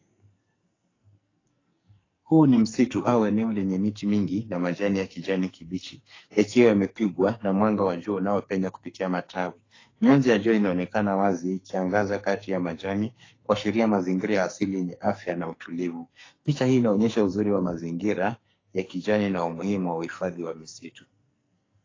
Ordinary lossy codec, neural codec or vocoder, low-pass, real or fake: AAC, 32 kbps; codec, 44.1 kHz, 2.6 kbps, SNAC; 7.2 kHz; fake